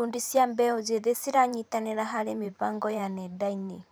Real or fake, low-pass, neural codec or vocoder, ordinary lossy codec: fake; none; vocoder, 44.1 kHz, 128 mel bands every 512 samples, BigVGAN v2; none